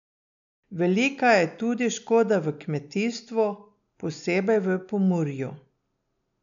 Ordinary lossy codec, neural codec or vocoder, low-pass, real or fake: none; none; 7.2 kHz; real